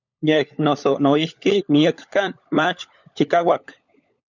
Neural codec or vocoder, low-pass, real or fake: codec, 16 kHz, 16 kbps, FunCodec, trained on LibriTTS, 50 frames a second; 7.2 kHz; fake